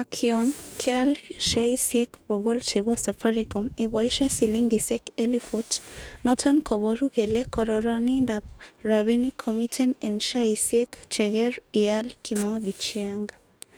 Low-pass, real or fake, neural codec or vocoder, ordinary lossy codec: none; fake; codec, 44.1 kHz, 2.6 kbps, DAC; none